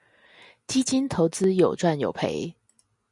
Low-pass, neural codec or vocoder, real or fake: 10.8 kHz; none; real